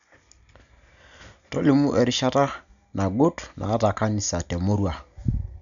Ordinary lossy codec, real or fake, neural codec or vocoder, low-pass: none; real; none; 7.2 kHz